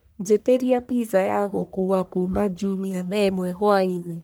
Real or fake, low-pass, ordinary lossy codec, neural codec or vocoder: fake; none; none; codec, 44.1 kHz, 1.7 kbps, Pupu-Codec